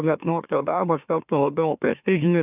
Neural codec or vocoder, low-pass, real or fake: autoencoder, 44.1 kHz, a latent of 192 numbers a frame, MeloTTS; 3.6 kHz; fake